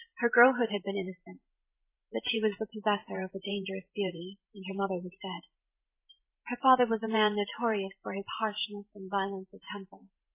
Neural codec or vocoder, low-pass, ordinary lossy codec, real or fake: vocoder, 44.1 kHz, 128 mel bands every 512 samples, BigVGAN v2; 3.6 kHz; MP3, 16 kbps; fake